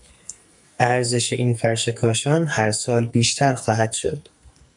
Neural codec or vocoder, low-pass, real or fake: codec, 44.1 kHz, 2.6 kbps, SNAC; 10.8 kHz; fake